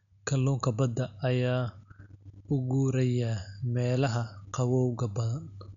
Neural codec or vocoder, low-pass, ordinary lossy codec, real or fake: none; 7.2 kHz; none; real